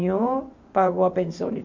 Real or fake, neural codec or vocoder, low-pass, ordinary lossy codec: fake; vocoder, 44.1 kHz, 128 mel bands every 512 samples, BigVGAN v2; 7.2 kHz; MP3, 48 kbps